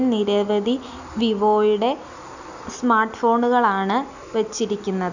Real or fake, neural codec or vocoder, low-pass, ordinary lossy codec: real; none; 7.2 kHz; none